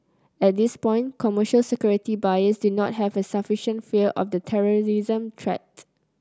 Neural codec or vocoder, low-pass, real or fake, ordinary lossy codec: none; none; real; none